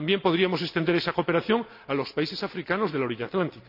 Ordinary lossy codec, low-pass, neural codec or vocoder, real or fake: none; 5.4 kHz; none; real